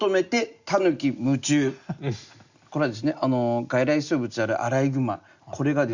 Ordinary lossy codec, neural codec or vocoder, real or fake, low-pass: Opus, 64 kbps; none; real; 7.2 kHz